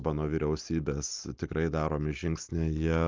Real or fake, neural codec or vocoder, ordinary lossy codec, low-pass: real; none; Opus, 24 kbps; 7.2 kHz